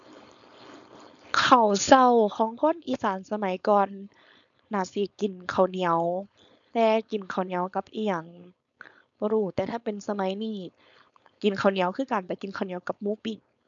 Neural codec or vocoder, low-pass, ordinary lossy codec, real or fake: codec, 16 kHz, 4.8 kbps, FACodec; 7.2 kHz; none; fake